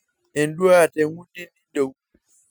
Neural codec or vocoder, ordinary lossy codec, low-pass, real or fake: none; none; none; real